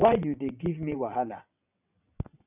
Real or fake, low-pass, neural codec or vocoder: fake; 3.6 kHz; vocoder, 22.05 kHz, 80 mel bands, WaveNeXt